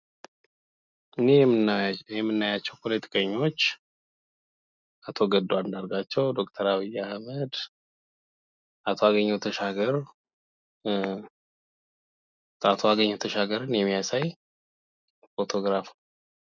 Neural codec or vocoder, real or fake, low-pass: none; real; 7.2 kHz